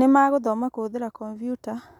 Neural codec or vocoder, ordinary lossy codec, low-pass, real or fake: none; MP3, 96 kbps; 19.8 kHz; real